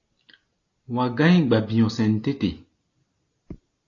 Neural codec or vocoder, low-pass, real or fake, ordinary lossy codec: none; 7.2 kHz; real; AAC, 32 kbps